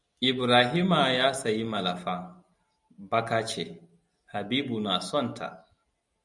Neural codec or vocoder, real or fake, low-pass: none; real; 10.8 kHz